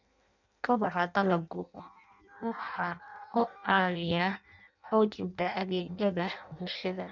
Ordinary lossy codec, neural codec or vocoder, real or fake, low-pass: none; codec, 16 kHz in and 24 kHz out, 0.6 kbps, FireRedTTS-2 codec; fake; 7.2 kHz